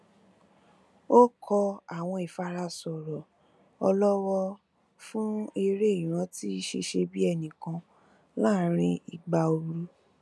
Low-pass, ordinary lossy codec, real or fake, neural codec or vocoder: none; none; real; none